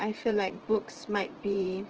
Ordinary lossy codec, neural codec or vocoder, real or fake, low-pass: Opus, 16 kbps; vocoder, 44.1 kHz, 80 mel bands, Vocos; fake; 7.2 kHz